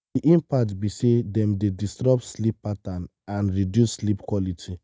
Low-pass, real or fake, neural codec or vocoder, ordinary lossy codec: none; real; none; none